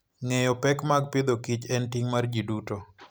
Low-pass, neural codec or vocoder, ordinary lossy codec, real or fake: none; none; none; real